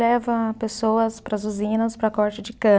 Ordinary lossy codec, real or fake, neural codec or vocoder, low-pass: none; real; none; none